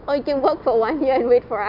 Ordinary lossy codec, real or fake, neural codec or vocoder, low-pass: none; real; none; 5.4 kHz